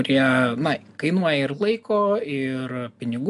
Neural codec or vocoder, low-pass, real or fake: none; 10.8 kHz; real